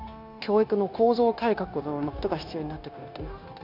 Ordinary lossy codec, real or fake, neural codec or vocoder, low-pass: none; fake; codec, 16 kHz, 0.9 kbps, LongCat-Audio-Codec; 5.4 kHz